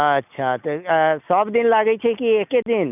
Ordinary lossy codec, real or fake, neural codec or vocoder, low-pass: none; real; none; 3.6 kHz